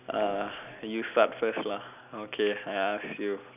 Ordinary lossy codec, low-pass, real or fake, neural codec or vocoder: none; 3.6 kHz; real; none